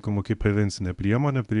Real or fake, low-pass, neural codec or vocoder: fake; 10.8 kHz; codec, 24 kHz, 0.9 kbps, WavTokenizer, medium speech release version 1